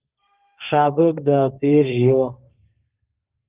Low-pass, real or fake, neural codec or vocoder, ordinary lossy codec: 3.6 kHz; fake; codec, 32 kHz, 1.9 kbps, SNAC; Opus, 32 kbps